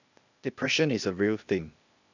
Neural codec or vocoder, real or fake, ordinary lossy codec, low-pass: codec, 16 kHz, 0.8 kbps, ZipCodec; fake; none; 7.2 kHz